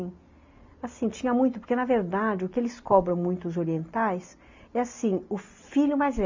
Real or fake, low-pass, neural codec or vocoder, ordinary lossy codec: real; 7.2 kHz; none; MP3, 64 kbps